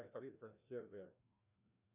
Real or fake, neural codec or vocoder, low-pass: fake; codec, 16 kHz, 1 kbps, FreqCodec, larger model; 3.6 kHz